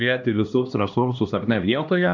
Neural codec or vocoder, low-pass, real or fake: codec, 16 kHz, 1 kbps, X-Codec, HuBERT features, trained on LibriSpeech; 7.2 kHz; fake